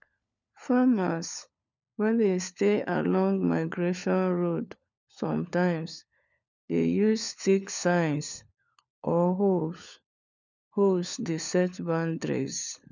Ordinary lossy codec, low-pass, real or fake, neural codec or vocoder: none; 7.2 kHz; fake; codec, 16 kHz, 4 kbps, FunCodec, trained on LibriTTS, 50 frames a second